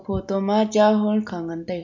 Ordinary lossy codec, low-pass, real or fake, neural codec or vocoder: MP3, 48 kbps; 7.2 kHz; real; none